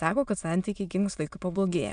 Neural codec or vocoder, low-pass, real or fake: autoencoder, 22.05 kHz, a latent of 192 numbers a frame, VITS, trained on many speakers; 9.9 kHz; fake